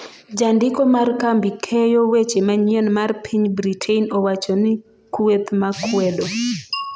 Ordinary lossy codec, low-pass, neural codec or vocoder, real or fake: none; none; none; real